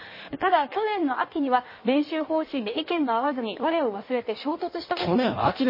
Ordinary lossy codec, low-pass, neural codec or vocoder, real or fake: MP3, 24 kbps; 5.4 kHz; codec, 16 kHz in and 24 kHz out, 1.1 kbps, FireRedTTS-2 codec; fake